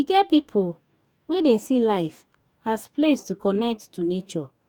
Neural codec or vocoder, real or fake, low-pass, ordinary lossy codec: codec, 44.1 kHz, 2.6 kbps, DAC; fake; 19.8 kHz; none